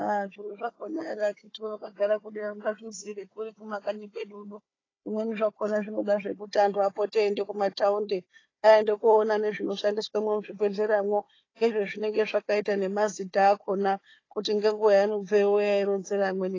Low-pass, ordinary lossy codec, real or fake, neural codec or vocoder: 7.2 kHz; AAC, 32 kbps; fake; codec, 16 kHz, 4 kbps, FunCodec, trained on Chinese and English, 50 frames a second